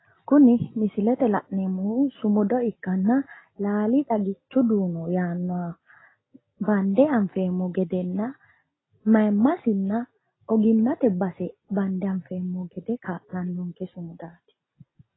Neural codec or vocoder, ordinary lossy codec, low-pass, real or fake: none; AAC, 16 kbps; 7.2 kHz; real